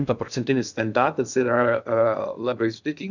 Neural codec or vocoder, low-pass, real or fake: codec, 16 kHz in and 24 kHz out, 0.6 kbps, FocalCodec, streaming, 2048 codes; 7.2 kHz; fake